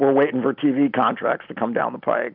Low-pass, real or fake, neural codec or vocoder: 5.4 kHz; real; none